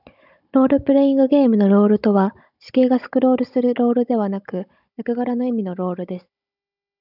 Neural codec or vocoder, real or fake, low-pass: codec, 16 kHz, 16 kbps, FunCodec, trained on Chinese and English, 50 frames a second; fake; 5.4 kHz